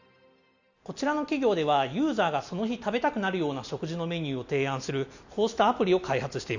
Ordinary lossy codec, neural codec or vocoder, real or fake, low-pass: none; none; real; 7.2 kHz